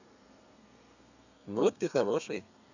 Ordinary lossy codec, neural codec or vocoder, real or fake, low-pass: none; codec, 32 kHz, 1.9 kbps, SNAC; fake; 7.2 kHz